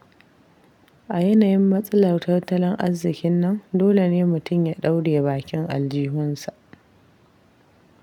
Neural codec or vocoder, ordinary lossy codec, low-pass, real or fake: none; none; 19.8 kHz; real